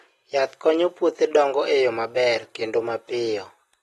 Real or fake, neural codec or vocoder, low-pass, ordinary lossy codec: real; none; 14.4 kHz; AAC, 32 kbps